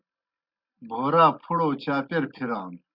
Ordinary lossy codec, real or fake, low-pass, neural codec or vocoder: Opus, 64 kbps; real; 5.4 kHz; none